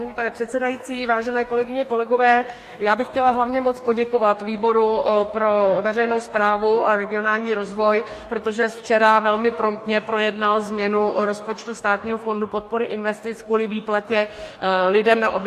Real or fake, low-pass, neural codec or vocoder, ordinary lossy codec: fake; 14.4 kHz; codec, 44.1 kHz, 2.6 kbps, DAC; AAC, 64 kbps